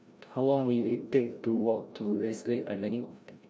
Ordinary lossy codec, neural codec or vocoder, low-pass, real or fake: none; codec, 16 kHz, 0.5 kbps, FreqCodec, larger model; none; fake